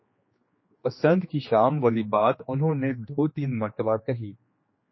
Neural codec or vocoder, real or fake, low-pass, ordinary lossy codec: codec, 16 kHz, 2 kbps, X-Codec, HuBERT features, trained on general audio; fake; 7.2 kHz; MP3, 24 kbps